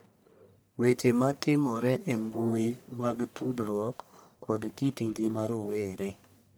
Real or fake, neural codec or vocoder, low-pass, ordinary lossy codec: fake; codec, 44.1 kHz, 1.7 kbps, Pupu-Codec; none; none